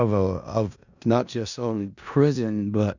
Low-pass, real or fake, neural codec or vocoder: 7.2 kHz; fake; codec, 16 kHz in and 24 kHz out, 0.4 kbps, LongCat-Audio-Codec, four codebook decoder